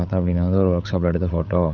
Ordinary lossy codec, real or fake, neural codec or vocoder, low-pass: none; fake; codec, 16 kHz, 8 kbps, FreqCodec, larger model; 7.2 kHz